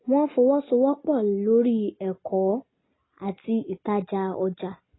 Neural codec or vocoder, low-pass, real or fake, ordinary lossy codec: none; 7.2 kHz; real; AAC, 16 kbps